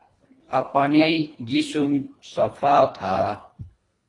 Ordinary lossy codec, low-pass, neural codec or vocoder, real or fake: AAC, 32 kbps; 10.8 kHz; codec, 24 kHz, 1.5 kbps, HILCodec; fake